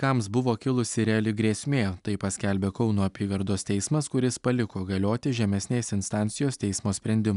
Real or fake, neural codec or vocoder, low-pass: real; none; 10.8 kHz